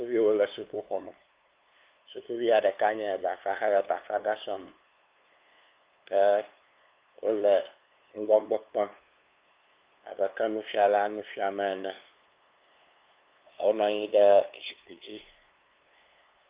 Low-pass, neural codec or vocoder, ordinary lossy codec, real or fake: 3.6 kHz; codec, 16 kHz, 2 kbps, FunCodec, trained on LibriTTS, 25 frames a second; Opus, 24 kbps; fake